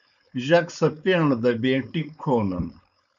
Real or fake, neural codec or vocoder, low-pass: fake; codec, 16 kHz, 4.8 kbps, FACodec; 7.2 kHz